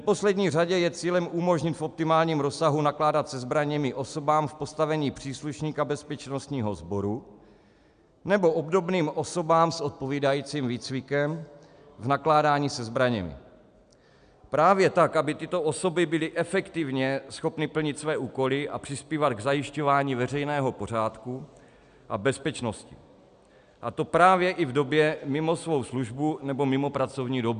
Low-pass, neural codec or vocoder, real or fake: 9.9 kHz; none; real